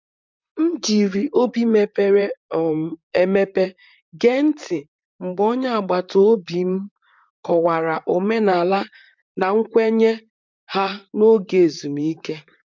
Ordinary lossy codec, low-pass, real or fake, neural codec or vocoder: MP3, 64 kbps; 7.2 kHz; fake; vocoder, 44.1 kHz, 128 mel bands, Pupu-Vocoder